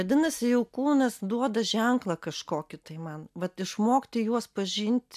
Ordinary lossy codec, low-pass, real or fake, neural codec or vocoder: MP3, 96 kbps; 14.4 kHz; real; none